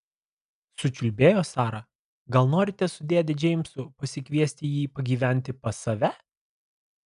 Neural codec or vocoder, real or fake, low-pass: none; real; 10.8 kHz